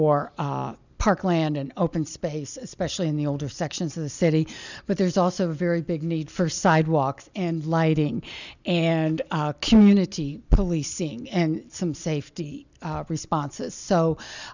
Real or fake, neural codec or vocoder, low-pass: real; none; 7.2 kHz